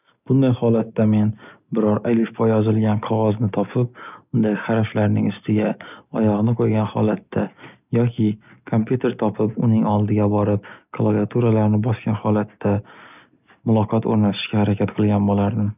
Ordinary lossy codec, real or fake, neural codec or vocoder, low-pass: none; real; none; 3.6 kHz